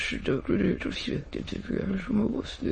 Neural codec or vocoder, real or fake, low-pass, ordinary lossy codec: autoencoder, 22.05 kHz, a latent of 192 numbers a frame, VITS, trained on many speakers; fake; 9.9 kHz; MP3, 32 kbps